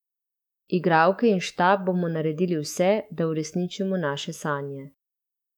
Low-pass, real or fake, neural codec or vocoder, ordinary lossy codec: 19.8 kHz; fake; autoencoder, 48 kHz, 128 numbers a frame, DAC-VAE, trained on Japanese speech; none